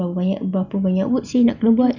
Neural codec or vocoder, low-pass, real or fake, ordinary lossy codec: none; 7.2 kHz; real; none